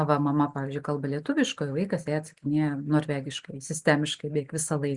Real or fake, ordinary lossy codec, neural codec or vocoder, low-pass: real; Opus, 64 kbps; none; 10.8 kHz